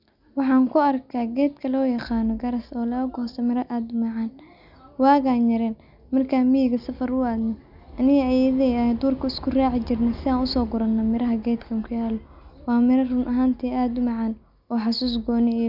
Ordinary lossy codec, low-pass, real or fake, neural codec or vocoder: none; 5.4 kHz; real; none